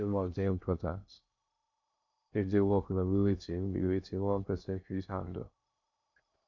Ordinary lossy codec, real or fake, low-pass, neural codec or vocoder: none; fake; 7.2 kHz; codec, 16 kHz in and 24 kHz out, 0.6 kbps, FocalCodec, streaming, 2048 codes